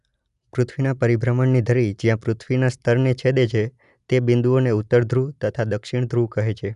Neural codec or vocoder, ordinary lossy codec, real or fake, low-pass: none; none; real; 10.8 kHz